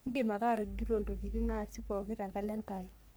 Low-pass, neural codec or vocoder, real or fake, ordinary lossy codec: none; codec, 44.1 kHz, 3.4 kbps, Pupu-Codec; fake; none